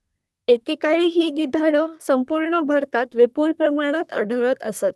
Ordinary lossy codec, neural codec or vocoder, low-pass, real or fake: none; codec, 24 kHz, 1 kbps, SNAC; none; fake